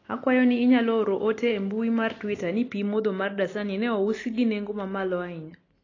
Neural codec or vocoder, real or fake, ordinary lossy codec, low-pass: none; real; AAC, 32 kbps; 7.2 kHz